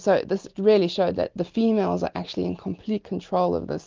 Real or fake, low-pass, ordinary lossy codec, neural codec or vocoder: real; 7.2 kHz; Opus, 32 kbps; none